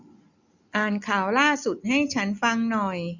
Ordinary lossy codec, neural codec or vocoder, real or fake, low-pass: none; none; real; 7.2 kHz